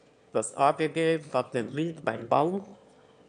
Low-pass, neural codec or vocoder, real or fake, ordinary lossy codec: 9.9 kHz; autoencoder, 22.05 kHz, a latent of 192 numbers a frame, VITS, trained on one speaker; fake; MP3, 96 kbps